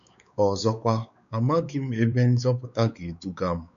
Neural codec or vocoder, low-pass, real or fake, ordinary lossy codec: codec, 16 kHz, 4 kbps, X-Codec, WavLM features, trained on Multilingual LibriSpeech; 7.2 kHz; fake; none